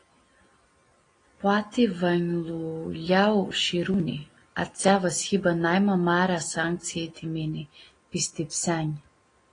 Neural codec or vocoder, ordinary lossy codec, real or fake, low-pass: none; AAC, 32 kbps; real; 9.9 kHz